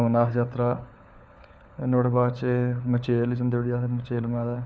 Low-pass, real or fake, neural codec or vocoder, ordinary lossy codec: none; fake; codec, 16 kHz, 4 kbps, FunCodec, trained on LibriTTS, 50 frames a second; none